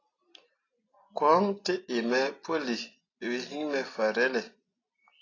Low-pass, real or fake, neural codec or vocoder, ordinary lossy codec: 7.2 kHz; real; none; AAC, 32 kbps